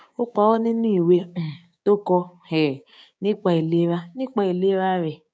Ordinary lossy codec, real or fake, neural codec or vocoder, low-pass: none; fake; codec, 16 kHz, 6 kbps, DAC; none